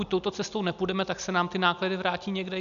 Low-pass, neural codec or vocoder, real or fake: 7.2 kHz; none; real